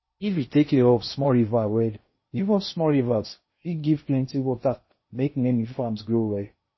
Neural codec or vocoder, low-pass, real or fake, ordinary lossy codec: codec, 16 kHz in and 24 kHz out, 0.6 kbps, FocalCodec, streaming, 4096 codes; 7.2 kHz; fake; MP3, 24 kbps